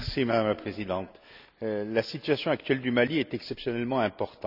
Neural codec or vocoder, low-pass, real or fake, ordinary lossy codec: none; 5.4 kHz; real; none